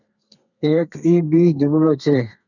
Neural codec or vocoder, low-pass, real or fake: codec, 32 kHz, 1.9 kbps, SNAC; 7.2 kHz; fake